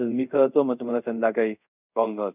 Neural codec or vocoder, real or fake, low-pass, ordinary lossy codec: codec, 24 kHz, 0.5 kbps, DualCodec; fake; 3.6 kHz; none